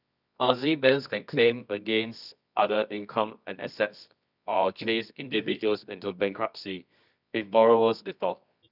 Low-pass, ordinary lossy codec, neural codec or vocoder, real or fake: 5.4 kHz; none; codec, 24 kHz, 0.9 kbps, WavTokenizer, medium music audio release; fake